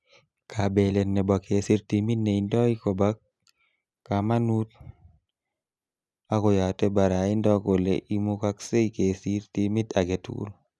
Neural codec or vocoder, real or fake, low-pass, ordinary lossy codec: none; real; none; none